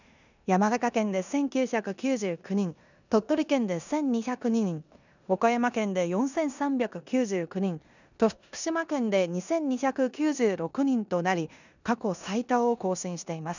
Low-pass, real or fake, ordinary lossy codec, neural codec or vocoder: 7.2 kHz; fake; none; codec, 16 kHz in and 24 kHz out, 0.9 kbps, LongCat-Audio-Codec, four codebook decoder